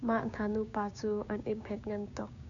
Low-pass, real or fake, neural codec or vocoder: 7.2 kHz; fake; codec, 16 kHz, 6 kbps, DAC